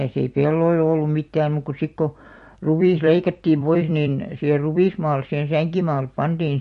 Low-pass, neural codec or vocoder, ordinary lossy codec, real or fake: 14.4 kHz; vocoder, 44.1 kHz, 128 mel bands every 256 samples, BigVGAN v2; MP3, 48 kbps; fake